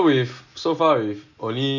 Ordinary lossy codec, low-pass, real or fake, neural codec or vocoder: none; 7.2 kHz; real; none